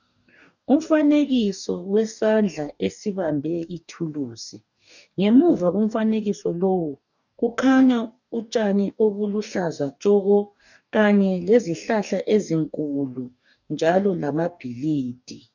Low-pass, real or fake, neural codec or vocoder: 7.2 kHz; fake; codec, 44.1 kHz, 2.6 kbps, DAC